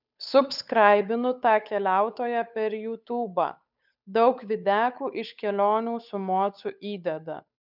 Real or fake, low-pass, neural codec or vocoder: fake; 5.4 kHz; codec, 16 kHz, 8 kbps, FunCodec, trained on Chinese and English, 25 frames a second